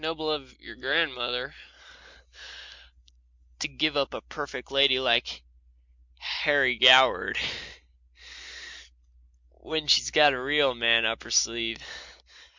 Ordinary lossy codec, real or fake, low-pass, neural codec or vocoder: MP3, 64 kbps; real; 7.2 kHz; none